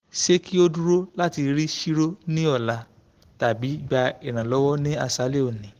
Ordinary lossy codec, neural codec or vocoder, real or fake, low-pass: Opus, 16 kbps; none; real; 14.4 kHz